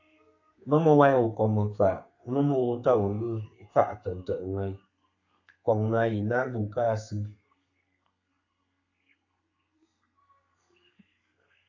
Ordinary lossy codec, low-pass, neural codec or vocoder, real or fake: AAC, 48 kbps; 7.2 kHz; codec, 32 kHz, 1.9 kbps, SNAC; fake